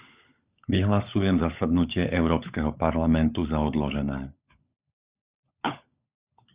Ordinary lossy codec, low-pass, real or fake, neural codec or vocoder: Opus, 64 kbps; 3.6 kHz; fake; codec, 16 kHz, 16 kbps, FunCodec, trained on LibriTTS, 50 frames a second